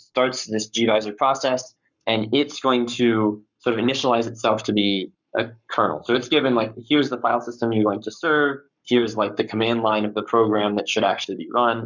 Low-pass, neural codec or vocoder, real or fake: 7.2 kHz; codec, 44.1 kHz, 7.8 kbps, Pupu-Codec; fake